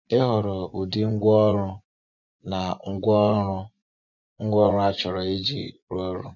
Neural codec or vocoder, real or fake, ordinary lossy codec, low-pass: vocoder, 24 kHz, 100 mel bands, Vocos; fake; none; 7.2 kHz